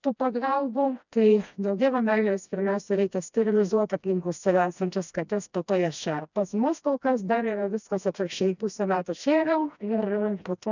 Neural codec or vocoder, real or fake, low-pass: codec, 16 kHz, 1 kbps, FreqCodec, smaller model; fake; 7.2 kHz